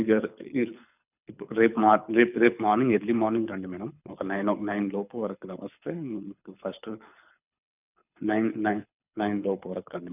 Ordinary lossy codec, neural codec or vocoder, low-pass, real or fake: none; codec, 24 kHz, 6 kbps, HILCodec; 3.6 kHz; fake